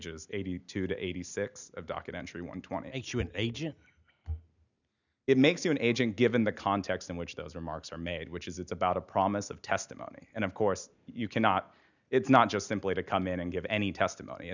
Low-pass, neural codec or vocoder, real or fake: 7.2 kHz; none; real